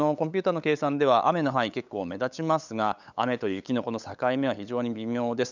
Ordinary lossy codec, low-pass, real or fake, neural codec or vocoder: none; 7.2 kHz; fake; codec, 16 kHz, 8 kbps, FunCodec, trained on LibriTTS, 25 frames a second